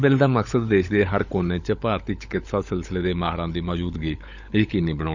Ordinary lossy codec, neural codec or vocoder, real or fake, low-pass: none; codec, 16 kHz, 16 kbps, FunCodec, trained on LibriTTS, 50 frames a second; fake; 7.2 kHz